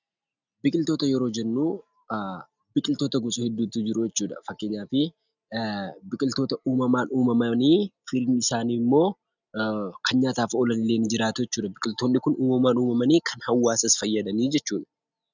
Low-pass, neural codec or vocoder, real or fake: 7.2 kHz; none; real